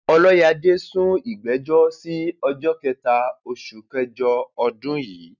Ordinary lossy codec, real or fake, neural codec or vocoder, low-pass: none; real; none; 7.2 kHz